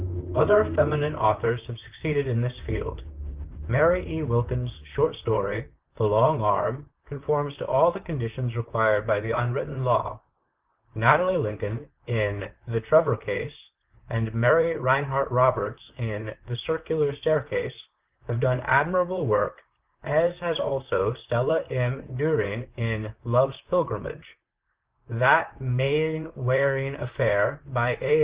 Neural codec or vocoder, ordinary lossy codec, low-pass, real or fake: vocoder, 44.1 kHz, 128 mel bands, Pupu-Vocoder; Opus, 32 kbps; 3.6 kHz; fake